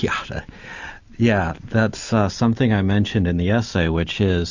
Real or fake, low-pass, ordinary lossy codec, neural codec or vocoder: real; 7.2 kHz; Opus, 64 kbps; none